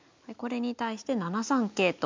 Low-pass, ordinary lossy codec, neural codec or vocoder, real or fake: 7.2 kHz; MP3, 64 kbps; none; real